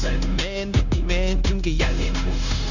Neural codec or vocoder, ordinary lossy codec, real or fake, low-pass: codec, 16 kHz, 0.9 kbps, LongCat-Audio-Codec; none; fake; 7.2 kHz